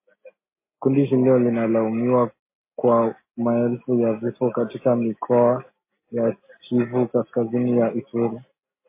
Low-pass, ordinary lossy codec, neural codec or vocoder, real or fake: 3.6 kHz; MP3, 24 kbps; none; real